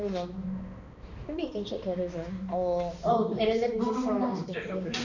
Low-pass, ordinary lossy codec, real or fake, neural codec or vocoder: 7.2 kHz; none; fake; codec, 16 kHz, 2 kbps, X-Codec, HuBERT features, trained on balanced general audio